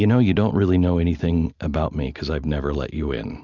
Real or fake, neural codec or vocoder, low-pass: real; none; 7.2 kHz